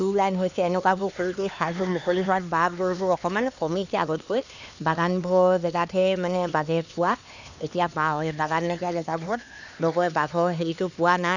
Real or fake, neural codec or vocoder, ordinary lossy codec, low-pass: fake; codec, 16 kHz, 2 kbps, X-Codec, HuBERT features, trained on LibriSpeech; none; 7.2 kHz